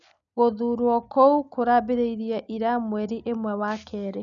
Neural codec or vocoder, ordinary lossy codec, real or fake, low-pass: none; none; real; 7.2 kHz